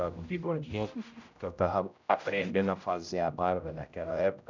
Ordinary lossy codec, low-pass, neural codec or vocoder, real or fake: none; 7.2 kHz; codec, 16 kHz, 0.5 kbps, X-Codec, HuBERT features, trained on general audio; fake